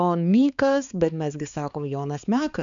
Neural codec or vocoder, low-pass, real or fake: codec, 16 kHz, 4 kbps, X-Codec, HuBERT features, trained on balanced general audio; 7.2 kHz; fake